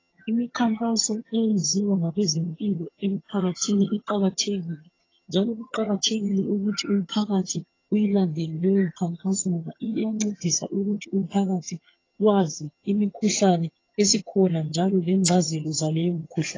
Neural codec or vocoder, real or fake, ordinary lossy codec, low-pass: vocoder, 22.05 kHz, 80 mel bands, HiFi-GAN; fake; AAC, 32 kbps; 7.2 kHz